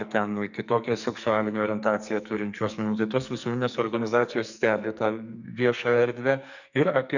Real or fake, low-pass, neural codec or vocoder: fake; 7.2 kHz; codec, 44.1 kHz, 2.6 kbps, SNAC